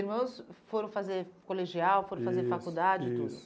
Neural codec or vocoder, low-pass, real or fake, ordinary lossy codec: none; none; real; none